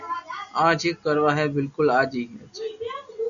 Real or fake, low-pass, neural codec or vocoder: real; 7.2 kHz; none